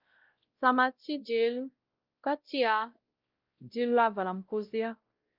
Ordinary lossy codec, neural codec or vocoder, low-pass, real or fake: Opus, 32 kbps; codec, 16 kHz, 0.5 kbps, X-Codec, WavLM features, trained on Multilingual LibriSpeech; 5.4 kHz; fake